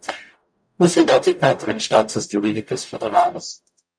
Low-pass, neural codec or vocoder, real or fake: 9.9 kHz; codec, 44.1 kHz, 0.9 kbps, DAC; fake